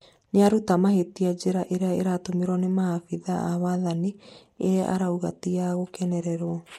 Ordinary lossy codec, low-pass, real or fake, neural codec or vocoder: MP3, 64 kbps; 19.8 kHz; real; none